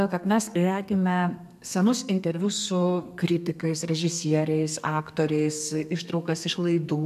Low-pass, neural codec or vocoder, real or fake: 14.4 kHz; codec, 32 kHz, 1.9 kbps, SNAC; fake